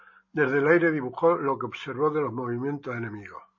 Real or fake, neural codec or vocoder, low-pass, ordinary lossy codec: real; none; 7.2 kHz; MP3, 32 kbps